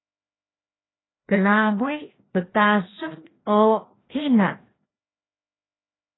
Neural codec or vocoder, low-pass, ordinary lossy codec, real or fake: codec, 16 kHz, 1 kbps, FreqCodec, larger model; 7.2 kHz; AAC, 16 kbps; fake